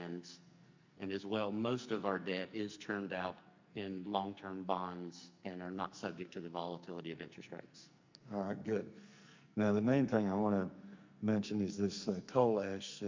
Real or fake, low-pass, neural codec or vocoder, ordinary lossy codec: fake; 7.2 kHz; codec, 44.1 kHz, 2.6 kbps, SNAC; MP3, 64 kbps